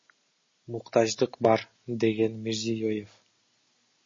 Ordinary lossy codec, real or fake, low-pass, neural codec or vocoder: MP3, 32 kbps; real; 7.2 kHz; none